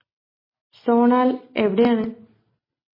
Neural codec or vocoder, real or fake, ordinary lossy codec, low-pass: none; real; MP3, 24 kbps; 5.4 kHz